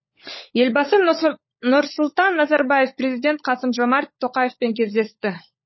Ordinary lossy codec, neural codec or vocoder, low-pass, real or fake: MP3, 24 kbps; codec, 16 kHz, 16 kbps, FunCodec, trained on LibriTTS, 50 frames a second; 7.2 kHz; fake